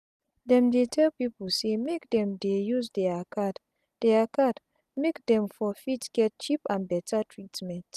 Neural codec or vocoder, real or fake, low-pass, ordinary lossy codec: none; real; 14.4 kHz; none